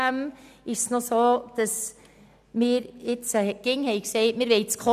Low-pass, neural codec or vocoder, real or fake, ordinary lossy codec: 14.4 kHz; none; real; none